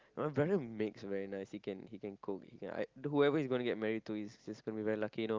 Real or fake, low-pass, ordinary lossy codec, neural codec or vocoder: real; 7.2 kHz; Opus, 32 kbps; none